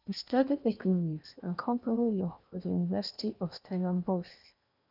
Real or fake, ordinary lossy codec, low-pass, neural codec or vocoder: fake; none; 5.4 kHz; codec, 16 kHz in and 24 kHz out, 0.8 kbps, FocalCodec, streaming, 65536 codes